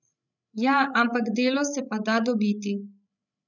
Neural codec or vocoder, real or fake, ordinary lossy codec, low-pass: codec, 16 kHz, 16 kbps, FreqCodec, larger model; fake; none; 7.2 kHz